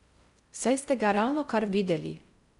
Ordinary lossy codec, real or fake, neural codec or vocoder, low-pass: none; fake; codec, 16 kHz in and 24 kHz out, 0.6 kbps, FocalCodec, streaming, 4096 codes; 10.8 kHz